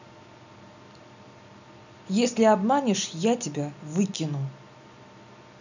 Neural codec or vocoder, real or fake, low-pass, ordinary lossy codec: none; real; 7.2 kHz; none